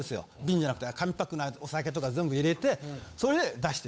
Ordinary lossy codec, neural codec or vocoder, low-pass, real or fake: none; codec, 16 kHz, 8 kbps, FunCodec, trained on Chinese and English, 25 frames a second; none; fake